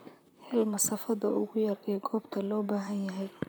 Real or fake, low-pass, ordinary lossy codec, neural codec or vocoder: fake; none; none; vocoder, 44.1 kHz, 128 mel bands, Pupu-Vocoder